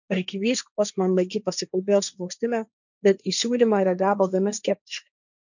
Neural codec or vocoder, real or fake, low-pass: codec, 16 kHz, 1.1 kbps, Voila-Tokenizer; fake; 7.2 kHz